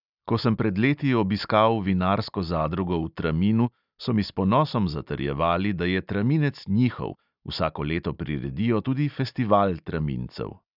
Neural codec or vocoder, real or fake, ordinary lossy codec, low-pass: none; real; none; 5.4 kHz